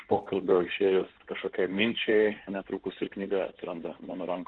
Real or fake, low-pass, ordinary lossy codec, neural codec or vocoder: fake; 9.9 kHz; Opus, 16 kbps; codec, 16 kHz in and 24 kHz out, 2.2 kbps, FireRedTTS-2 codec